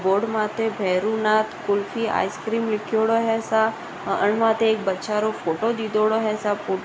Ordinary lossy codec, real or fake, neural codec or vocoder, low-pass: none; real; none; none